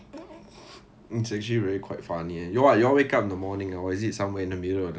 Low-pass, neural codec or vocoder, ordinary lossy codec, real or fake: none; none; none; real